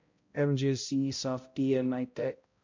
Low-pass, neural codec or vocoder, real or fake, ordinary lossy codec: 7.2 kHz; codec, 16 kHz, 0.5 kbps, X-Codec, HuBERT features, trained on balanced general audio; fake; MP3, 48 kbps